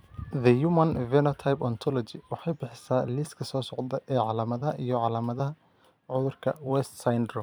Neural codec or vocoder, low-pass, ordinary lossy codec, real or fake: vocoder, 44.1 kHz, 128 mel bands every 256 samples, BigVGAN v2; none; none; fake